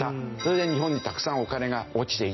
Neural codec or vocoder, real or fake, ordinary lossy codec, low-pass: none; real; MP3, 24 kbps; 7.2 kHz